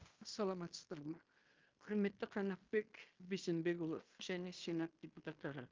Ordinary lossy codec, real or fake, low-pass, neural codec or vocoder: Opus, 32 kbps; fake; 7.2 kHz; codec, 16 kHz in and 24 kHz out, 0.9 kbps, LongCat-Audio-Codec, fine tuned four codebook decoder